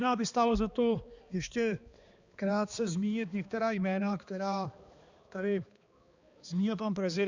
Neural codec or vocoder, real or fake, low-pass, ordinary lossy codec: codec, 16 kHz, 2 kbps, X-Codec, HuBERT features, trained on balanced general audio; fake; 7.2 kHz; Opus, 64 kbps